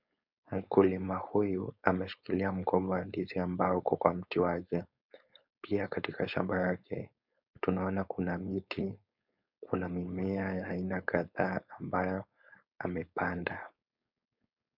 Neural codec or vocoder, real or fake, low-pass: codec, 16 kHz, 4.8 kbps, FACodec; fake; 5.4 kHz